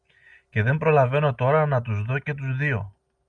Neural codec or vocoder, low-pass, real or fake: none; 9.9 kHz; real